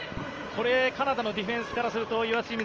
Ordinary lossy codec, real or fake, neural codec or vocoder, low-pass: Opus, 24 kbps; real; none; 7.2 kHz